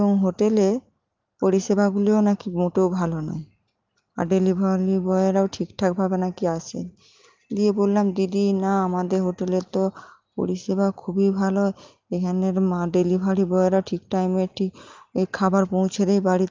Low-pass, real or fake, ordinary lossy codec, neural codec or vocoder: 7.2 kHz; real; Opus, 32 kbps; none